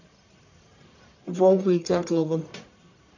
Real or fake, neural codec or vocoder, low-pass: fake; codec, 44.1 kHz, 1.7 kbps, Pupu-Codec; 7.2 kHz